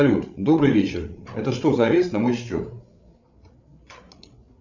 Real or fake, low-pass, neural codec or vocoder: fake; 7.2 kHz; codec, 16 kHz, 8 kbps, FreqCodec, larger model